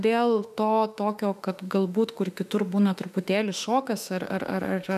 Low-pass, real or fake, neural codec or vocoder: 14.4 kHz; fake; autoencoder, 48 kHz, 32 numbers a frame, DAC-VAE, trained on Japanese speech